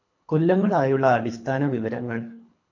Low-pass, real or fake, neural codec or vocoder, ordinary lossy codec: 7.2 kHz; fake; codec, 24 kHz, 3 kbps, HILCodec; AAC, 48 kbps